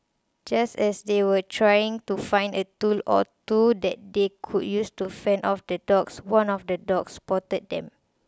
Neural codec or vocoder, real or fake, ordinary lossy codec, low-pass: none; real; none; none